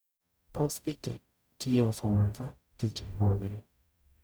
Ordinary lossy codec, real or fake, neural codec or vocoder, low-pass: none; fake; codec, 44.1 kHz, 0.9 kbps, DAC; none